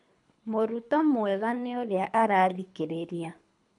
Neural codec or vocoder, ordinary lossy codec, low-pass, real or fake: codec, 24 kHz, 3 kbps, HILCodec; none; 10.8 kHz; fake